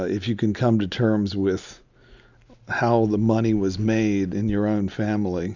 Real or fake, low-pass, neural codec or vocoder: real; 7.2 kHz; none